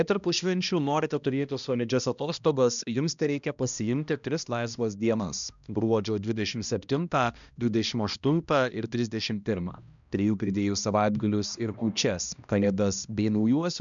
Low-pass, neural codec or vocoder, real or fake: 7.2 kHz; codec, 16 kHz, 1 kbps, X-Codec, HuBERT features, trained on balanced general audio; fake